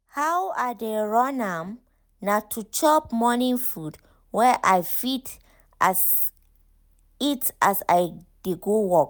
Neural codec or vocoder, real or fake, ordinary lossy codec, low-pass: none; real; none; none